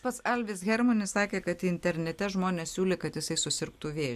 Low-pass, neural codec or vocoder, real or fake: 14.4 kHz; none; real